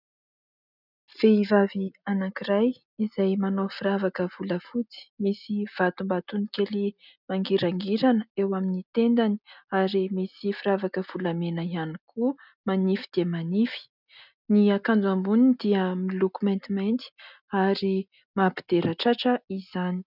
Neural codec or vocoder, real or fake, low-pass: none; real; 5.4 kHz